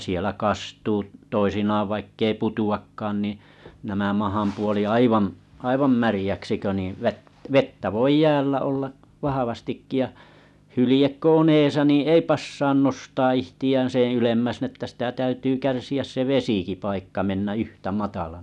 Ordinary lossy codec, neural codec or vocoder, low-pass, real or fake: none; none; none; real